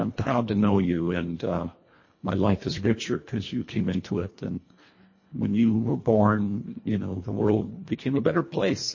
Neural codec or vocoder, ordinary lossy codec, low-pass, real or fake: codec, 24 kHz, 1.5 kbps, HILCodec; MP3, 32 kbps; 7.2 kHz; fake